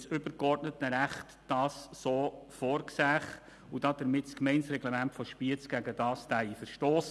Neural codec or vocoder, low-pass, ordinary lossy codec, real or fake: none; none; none; real